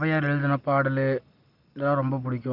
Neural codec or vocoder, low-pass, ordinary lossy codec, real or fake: none; 5.4 kHz; Opus, 32 kbps; real